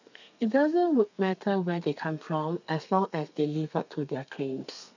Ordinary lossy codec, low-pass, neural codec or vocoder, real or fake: none; 7.2 kHz; codec, 32 kHz, 1.9 kbps, SNAC; fake